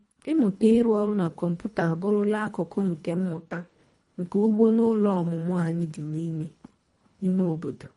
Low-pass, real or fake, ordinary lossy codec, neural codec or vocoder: 10.8 kHz; fake; MP3, 48 kbps; codec, 24 kHz, 1.5 kbps, HILCodec